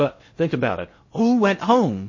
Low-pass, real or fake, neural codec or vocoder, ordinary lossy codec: 7.2 kHz; fake; codec, 16 kHz in and 24 kHz out, 0.8 kbps, FocalCodec, streaming, 65536 codes; MP3, 32 kbps